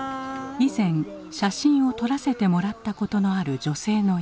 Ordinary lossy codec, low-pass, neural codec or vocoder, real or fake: none; none; none; real